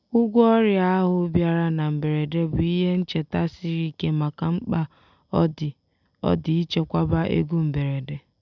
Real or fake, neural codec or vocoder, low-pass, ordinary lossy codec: real; none; 7.2 kHz; none